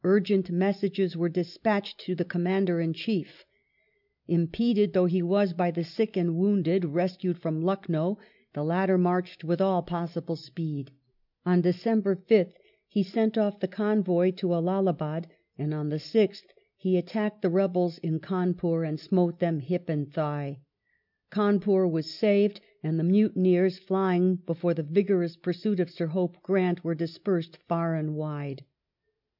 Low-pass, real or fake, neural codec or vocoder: 5.4 kHz; real; none